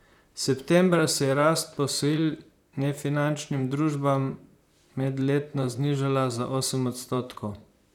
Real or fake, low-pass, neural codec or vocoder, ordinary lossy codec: fake; 19.8 kHz; vocoder, 44.1 kHz, 128 mel bands, Pupu-Vocoder; none